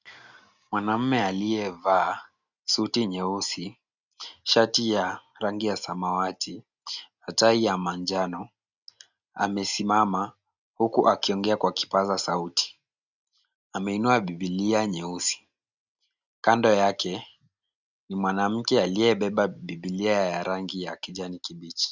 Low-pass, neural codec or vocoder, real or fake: 7.2 kHz; none; real